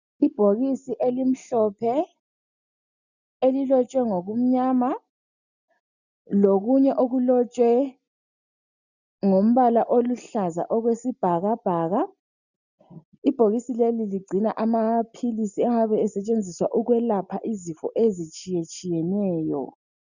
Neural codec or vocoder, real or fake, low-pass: none; real; 7.2 kHz